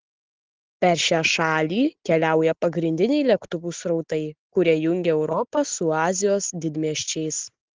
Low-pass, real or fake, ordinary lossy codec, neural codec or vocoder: 7.2 kHz; real; Opus, 16 kbps; none